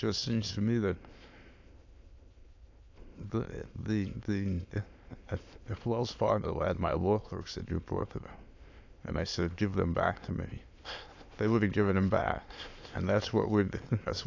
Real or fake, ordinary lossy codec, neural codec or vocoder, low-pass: fake; AAC, 48 kbps; autoencoder, 22.05 kHz, a latent of 192 numbers a frame, VITS, trained on many speakers; 7.2 kHz